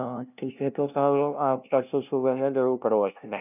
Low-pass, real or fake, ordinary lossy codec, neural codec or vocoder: 3.6 kHz; fake; none; codec, 16 kHz, 0.5 kbps, FunCodec, trained on LibriTTS, 25 frames a second